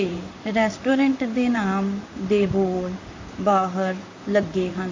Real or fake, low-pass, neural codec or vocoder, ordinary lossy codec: fake; 7.2 kHz; vocoder, 44.1 kHz, 128 mel bands, Pupu-Vocoder; MP3, 48 kbps